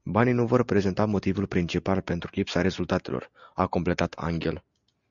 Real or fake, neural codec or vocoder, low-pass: real; none; 7.2 kHz